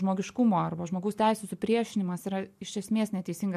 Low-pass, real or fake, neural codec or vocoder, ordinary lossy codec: 14.4 kHz; real; none; MP3, 64 kbps